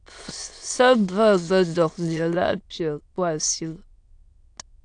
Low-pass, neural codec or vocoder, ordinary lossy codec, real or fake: 9.9 kHz; autoencoder, 22.05 kHz, a latent of 192 numbers a frame, VITS, trained on many speakers; AAC, 64 kbps; fake